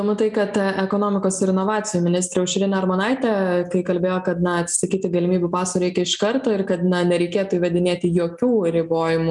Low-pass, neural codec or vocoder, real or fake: 10.8 kHz; none; real